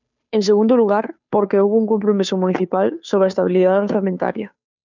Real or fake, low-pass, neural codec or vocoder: fake; 7.2 kHz; codec, 16 kHz, 2 kbps, FunCodec, trained on Chinese and English, 25 frames a second